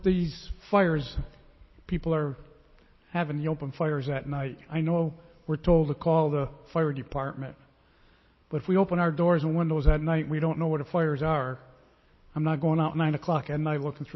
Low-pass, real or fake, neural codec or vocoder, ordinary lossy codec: 7.2 kHz; real; none; MP3, 24 kbps